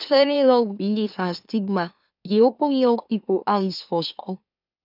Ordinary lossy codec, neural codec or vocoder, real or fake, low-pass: none; autoencoder, 44.1 kHz, a latent of 192 numbers a frame, MeloTTS; fake; 5.4 kHz